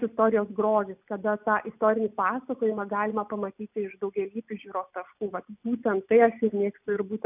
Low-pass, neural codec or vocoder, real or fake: 3.6 kHz; none; real